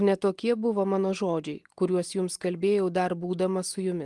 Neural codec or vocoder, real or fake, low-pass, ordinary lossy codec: none; real; 10.8 kHz; Opus, 24 kbps